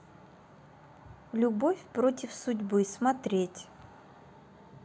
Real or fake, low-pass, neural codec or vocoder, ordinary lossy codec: real; none; none; none